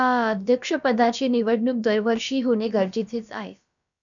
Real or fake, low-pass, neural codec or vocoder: fake; 7.2 kHz; codec, 16 kHz, about 1 kbps, DyCAST, with the encoder's durations